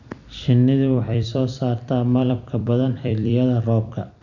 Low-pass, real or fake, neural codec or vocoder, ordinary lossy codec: 7.2 kHz; fake; vocoder, 44.1 kHz, 128 mel bands every 256 samples, BigVGAN v2; none